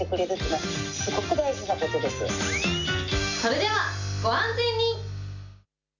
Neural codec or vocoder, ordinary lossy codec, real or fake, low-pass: none; none; real; 7.2 kHz